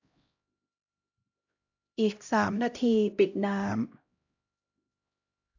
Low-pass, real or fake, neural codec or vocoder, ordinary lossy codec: 7.2 kHz; fake; codec, 16 kHz, 0.5 kbps, X-Codec, HuBERT features, trained on LibriSpeech; AAC, 48 kbps